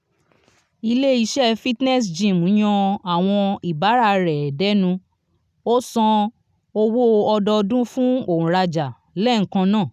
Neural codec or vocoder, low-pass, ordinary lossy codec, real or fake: none; 14.4 kHz; none; real